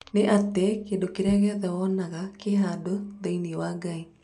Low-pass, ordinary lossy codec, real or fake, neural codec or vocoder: 10.8 kHz; none; real; none